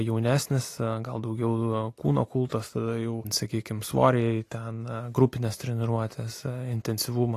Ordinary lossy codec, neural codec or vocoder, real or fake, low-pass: AAC, 48 kbps; none; real; 14.4 kHz